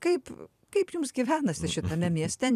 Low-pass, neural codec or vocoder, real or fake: 14.4 kHz; vocoder, 44.1 kHz, 128 mel bands every 256 samples, BigVGAN v2; fake